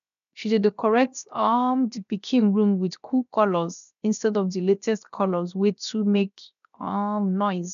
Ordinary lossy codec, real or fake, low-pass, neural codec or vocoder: none; fake; 7.2 kHz; codec, 16 kHz, 0.7 kbps, FocalCodec